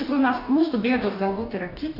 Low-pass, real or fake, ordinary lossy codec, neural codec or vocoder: 5.4 kHz; fake; AAC, 32 kbps; codec, 44.1 kHz, 2.6 kbps, DAC